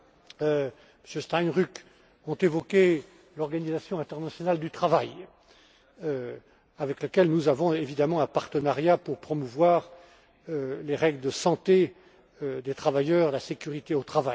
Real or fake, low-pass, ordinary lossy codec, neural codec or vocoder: real; none; none; none